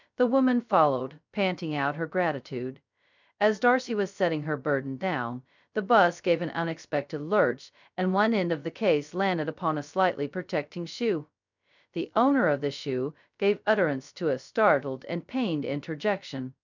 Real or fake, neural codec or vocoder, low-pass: fake; codec, 16 kHz, 0.2 kbps, FocalCodec; 7.2 kHz